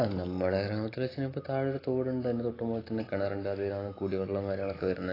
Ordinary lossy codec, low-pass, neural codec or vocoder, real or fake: AAC, 24 kbps; 5.4 kHz; none; real